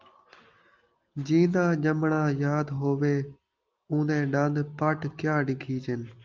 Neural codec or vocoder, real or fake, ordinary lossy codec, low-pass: none; real; Opus, 32 kbps; 7.2 kHz